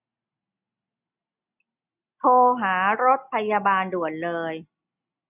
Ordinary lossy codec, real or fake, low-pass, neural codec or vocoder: none; real; 3.6 kHz; none